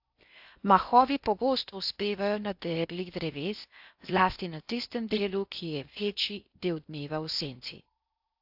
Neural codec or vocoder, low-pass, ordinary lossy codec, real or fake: codec, 16 kHz in and 24 kHz out, 0.6 kbps, FocalCodec, streaming, 4096 codes; 5.4 kHz; AAC, 48 kbps; fake